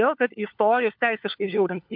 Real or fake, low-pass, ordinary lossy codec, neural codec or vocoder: fake; 5.4 kHz; MP3, 48 kbps; codec, 16 kHz, 4 kbps, FunCodec, trained on LibriTTS, 50 frames a second